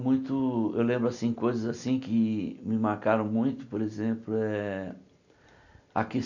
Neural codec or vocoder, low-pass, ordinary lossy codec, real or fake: none; 7.2 kHz; none; real